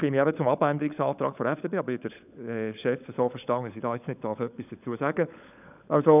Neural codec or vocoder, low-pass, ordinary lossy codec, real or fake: codec, 16 kHz, 16 kbps, FunCodec, trained on LibriTTS, 50 frames a second; 3.6 kHz; none; fake